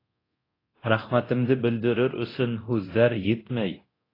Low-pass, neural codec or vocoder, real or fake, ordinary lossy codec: 5.4 kHz; codec, 24 kHz, 0.9 kbps, DualCodec; fake; AAC, 24 kbps